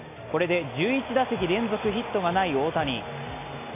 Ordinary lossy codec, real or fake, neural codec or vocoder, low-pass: AAC, 24 kbps; real; none; 3.6 kHz